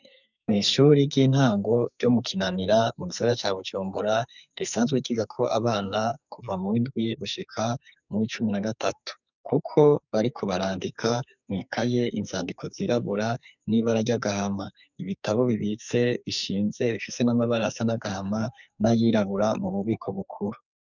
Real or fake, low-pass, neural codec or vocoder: fake; 7.2 kHz; codec, 44.1 kHz, 2.6 kbps, SNAC